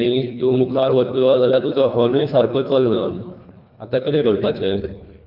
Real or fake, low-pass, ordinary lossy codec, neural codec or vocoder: fake; 5.4 kHz; none; codec, 24 kHz, 1.5 kbps, HILCodec